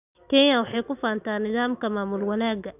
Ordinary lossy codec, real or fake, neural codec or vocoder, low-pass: none; real; none; 3.6 kHz